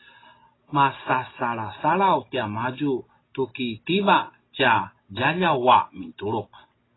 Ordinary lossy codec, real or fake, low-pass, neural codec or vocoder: AAC, 16 kbps; real; 7.2 kHz; none